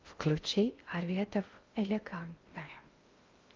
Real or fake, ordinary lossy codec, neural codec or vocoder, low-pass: fake; Opus, 32 kbps; codec, 16 kHz in and 24 kHz out, 0.6 kbps, FocalCodec, streaming, 2048 codes; 7.2 kHz